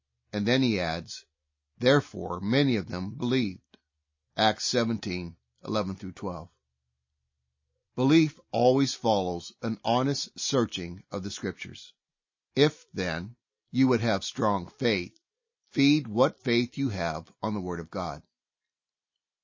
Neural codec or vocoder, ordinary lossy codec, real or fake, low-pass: none; MP3, 32 kbps; real; 7.2 kHz